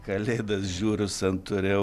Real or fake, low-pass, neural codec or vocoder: real; 14.4 kHz; none